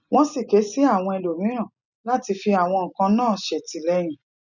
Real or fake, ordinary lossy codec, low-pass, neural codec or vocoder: real; none; 7.2 kHz; none